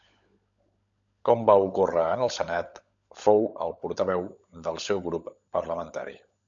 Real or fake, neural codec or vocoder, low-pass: fake; codec, 16 kHz, 16 kbps, FunCodec, trained on LibriTTS, 50 frames a second; 7.2 kHz